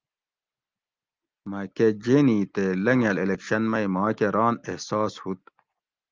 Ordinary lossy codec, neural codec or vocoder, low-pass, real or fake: Opus, 24 kbps; none; 7.2 kHz; real